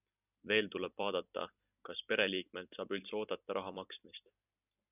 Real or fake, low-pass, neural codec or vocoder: fake; 3.6 kHz; vocoder, 44.1 kHz, 128 mel bands every 256 samples, BigVGAN v2